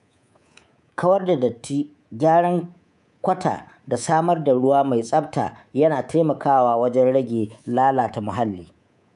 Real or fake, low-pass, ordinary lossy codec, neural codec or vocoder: fake; 10.8 kHz; none; codec, 24 kHz, 3.1 kbps, DualCodec